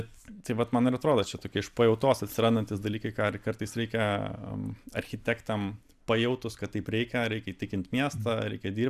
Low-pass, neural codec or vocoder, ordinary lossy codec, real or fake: 14.4 kHz; vocoder, 44.1 kHz, 128 mel bands every 256 samples, BigVGAN v2; AAC, 96 kbps; fake